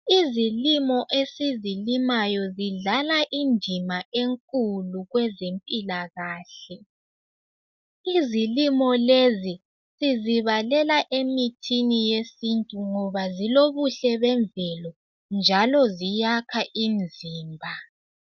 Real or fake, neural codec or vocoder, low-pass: real; none; 7.2 kHz